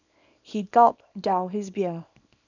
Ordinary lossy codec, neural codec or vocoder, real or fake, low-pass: none; codec, 24 kHz, 0.9 kbps, WavTokenizer, small release; fake; 7.2 kHz